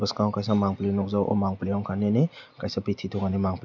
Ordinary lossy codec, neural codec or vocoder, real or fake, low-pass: none; none; real; 7.2 kHz